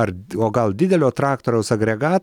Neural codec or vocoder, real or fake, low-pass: none; real; 19.8 kHz